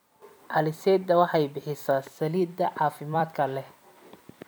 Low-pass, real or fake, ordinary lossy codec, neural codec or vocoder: none; fake; none; vocoder, 44.1 kHz, 128 mel bands every 256 samples, BigVGAN v2